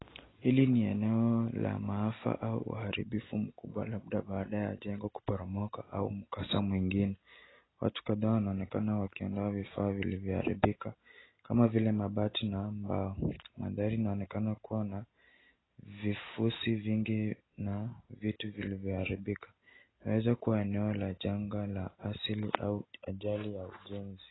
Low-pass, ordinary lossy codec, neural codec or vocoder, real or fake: 7.2 kHz; AAC, 16 kbps; none; real